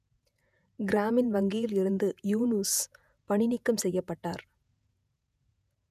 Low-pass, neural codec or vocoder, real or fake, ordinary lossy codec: 14.4 kHz; vocoder, 48 kHz, 128 mel bands, Vocos; fake; none